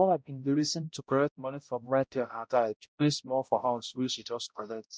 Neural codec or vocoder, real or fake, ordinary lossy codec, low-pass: codec, 16 kHz, 0.5 kbps, X-Codec, HuBERT features, trained on balanced general audio; fake; none; none